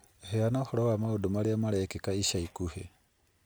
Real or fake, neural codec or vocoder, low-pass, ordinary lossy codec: real; none; none; none